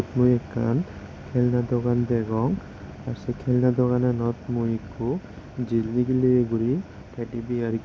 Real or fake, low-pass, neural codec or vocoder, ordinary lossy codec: real; none; none; none